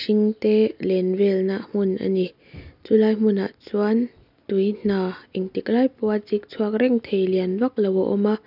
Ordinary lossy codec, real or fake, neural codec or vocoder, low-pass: none; real; none; 5.4 kHz